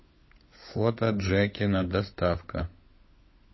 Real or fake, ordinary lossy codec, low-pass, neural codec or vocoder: fake; MP3, 24 kbps; 7.2 kHz; codec, 16 kHz, 16 kbps, FunCodec, trained on LibriTTS, 50 frames a second